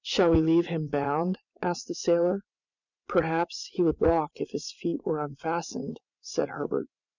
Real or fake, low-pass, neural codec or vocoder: fake; 7.2 kHz; vocoder, 44.1 kHz, 80 mel bands, Vocos